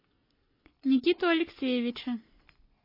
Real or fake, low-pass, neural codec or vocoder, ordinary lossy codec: real; 5.4 kHz; none; MP3, 24 kbps